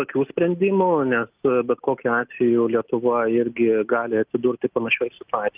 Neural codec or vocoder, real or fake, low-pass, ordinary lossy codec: none; real; 3.6 kHz; Opus, 32 kbps